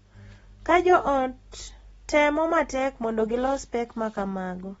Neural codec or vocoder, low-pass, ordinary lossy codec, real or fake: none; 19.8 kHz; AAC, 24 kbps; real